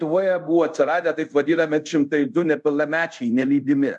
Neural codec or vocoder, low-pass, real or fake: codec, 24 kHz, 0.5 kbps, DualCodec; 10.8 kHz; fake